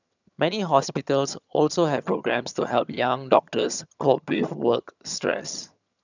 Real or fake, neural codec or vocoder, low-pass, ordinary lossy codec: fake; vocoder, 22.05 kHz, 80 mel bands, HiFi-GAN; 7.2 kHz; none